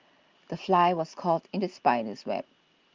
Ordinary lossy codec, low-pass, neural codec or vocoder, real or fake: Opus, 32 kbps; 7.2 kHz; none; real